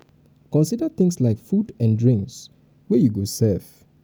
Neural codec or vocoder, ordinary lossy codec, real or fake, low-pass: none; none; real; none